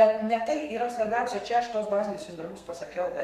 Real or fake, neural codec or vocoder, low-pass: fake; codec, 32 kHz, 1.9 kbps, SNAC; 14.4 kHz